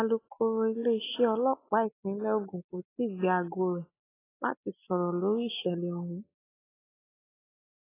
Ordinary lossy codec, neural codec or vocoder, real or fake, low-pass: AAC, 16 kbps; none; real; 3.6 kHz